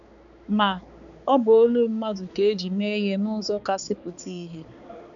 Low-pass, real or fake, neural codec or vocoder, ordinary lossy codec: 7.2 kHz; fake; codec, 16 kHz, 4 kbps, X-Codec, HuBERT features, trained on balanced general audio; none